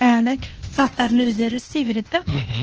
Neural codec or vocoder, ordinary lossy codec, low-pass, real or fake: codec, 16 kHz, 0.8 kbps, ZipCodec; Opus, 16 kbps; 7.2 kHz; fake